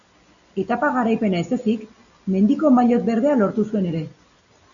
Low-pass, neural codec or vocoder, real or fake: 7.2 kHz; none; real